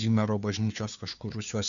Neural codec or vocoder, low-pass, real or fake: codec, 16 kHz, 2 kbps, FunCodec, trained on Chinese and English, 25 frames a second; 7.2 kHz; fake